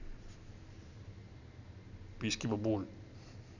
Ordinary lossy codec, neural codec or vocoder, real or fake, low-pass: none; none; real; 7.2 kHz